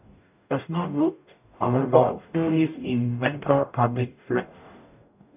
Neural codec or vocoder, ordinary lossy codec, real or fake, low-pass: codec, 44.1 kHz, 0.9 kbps, DAC; none; fake; 3.6 kHz